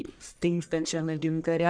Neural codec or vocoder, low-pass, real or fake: codec, 44.1 kHz, 1.7 kbps, Pupu-Codec; 9.9 kHz; fake